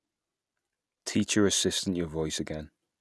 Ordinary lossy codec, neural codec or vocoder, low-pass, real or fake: none; none; none; real